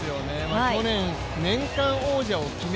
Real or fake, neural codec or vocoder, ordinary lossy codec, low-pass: real; none; none; none